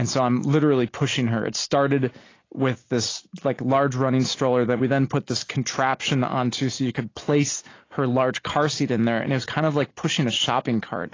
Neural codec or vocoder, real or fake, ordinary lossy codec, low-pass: none; real; AAC, 32 kbps; 7.2 kHz